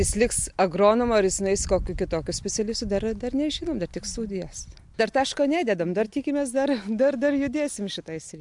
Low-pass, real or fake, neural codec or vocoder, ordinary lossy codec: 10.8 kHz; real; none; MP3, 64 kbps